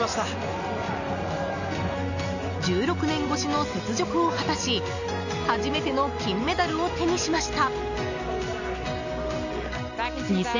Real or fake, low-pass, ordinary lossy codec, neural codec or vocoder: real; 7.2 kHz; none; none